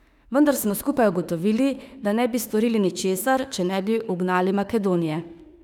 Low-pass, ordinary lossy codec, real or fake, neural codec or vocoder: 19.8 kHz; none; fake; autoencoder, 48 kHz, 32 numbers a frame, DAC-VAE, trained on Japanese speech